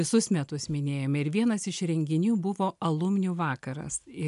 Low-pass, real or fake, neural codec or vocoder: 10.8 kHz; real; none